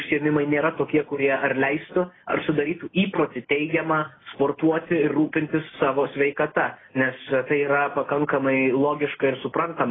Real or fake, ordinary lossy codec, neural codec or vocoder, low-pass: real; AAC, 16 kbps; none; 7.2 kHz